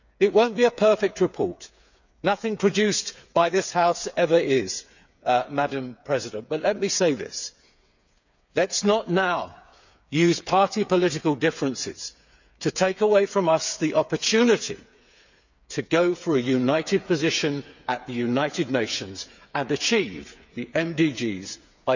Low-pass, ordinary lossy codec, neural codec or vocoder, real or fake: 7.2 kHz; none; codec, 16 kHz, 8 kbps, FreqCodec, smaller model; fake